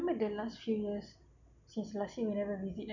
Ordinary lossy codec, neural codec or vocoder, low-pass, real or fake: none; none; 7.2 kHz; real